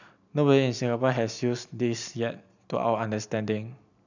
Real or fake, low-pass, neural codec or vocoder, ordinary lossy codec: real; 7.2 kHz; none; none